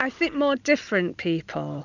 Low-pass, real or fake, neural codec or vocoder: 7.2 kHz; real; none